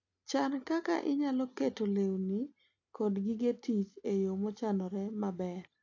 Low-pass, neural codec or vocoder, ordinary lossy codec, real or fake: 7.2 kHz; none; AAC, 48 kbps; real